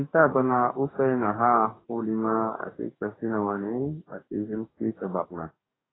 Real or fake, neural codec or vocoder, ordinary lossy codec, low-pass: fake; codec, 44.1 kHz, 2.6 kbps, DAC; AAC, 16 kbps; 7.2 kHz